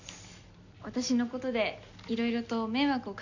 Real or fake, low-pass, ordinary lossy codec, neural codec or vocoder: real; 7.2 kHz; none; none